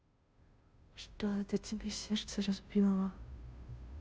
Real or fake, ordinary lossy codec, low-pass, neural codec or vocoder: fake; none; none; codec, 16 kHz, 0.5 kbps, FunCodec, trained on Chinese and English, 25 frames a second